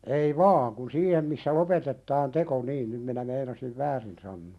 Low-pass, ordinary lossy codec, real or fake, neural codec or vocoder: none; none; real; none